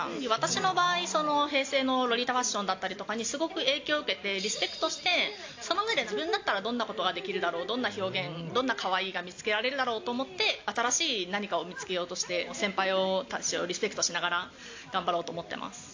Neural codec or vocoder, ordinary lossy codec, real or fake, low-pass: none; AAC, 48 kbps; real; 7.2 kHz